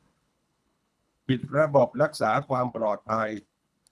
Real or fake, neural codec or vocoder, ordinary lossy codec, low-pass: fake; codec, 24 kHz, 3 kbps, HILCodec; none; none